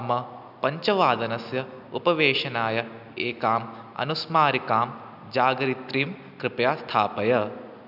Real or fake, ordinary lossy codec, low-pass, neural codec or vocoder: real; none; 5.4 kHz; none